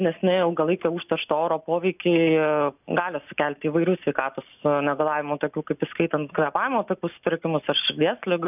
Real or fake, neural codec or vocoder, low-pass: real; none; 3.6 kHz